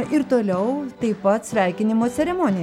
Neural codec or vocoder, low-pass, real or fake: none; 19.8 kHz; real